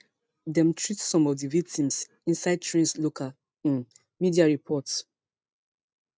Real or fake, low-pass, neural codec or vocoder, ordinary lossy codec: real; none; none; none